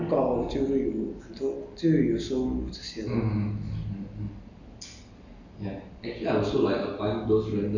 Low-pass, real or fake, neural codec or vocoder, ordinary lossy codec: 7.2 kHz; real; none; none